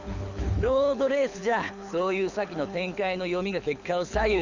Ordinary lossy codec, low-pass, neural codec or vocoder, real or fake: Opus, 64 kbps; 7.2 kHz; codec, 24 kHz, 6 kbps, HILCodec; fake